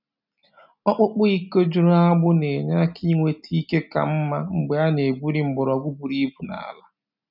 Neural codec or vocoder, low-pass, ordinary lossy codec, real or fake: none; 5.4 kHz; none; real